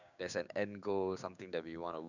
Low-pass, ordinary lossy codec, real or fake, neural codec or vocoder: 7.2 kHz; none; fake; codec, 16 kHz, 6 kbps, DAC